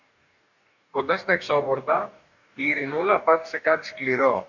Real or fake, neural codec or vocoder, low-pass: fake; codec, 44.1 kHz, 2.6 kbps, DAC; 7.2 kHz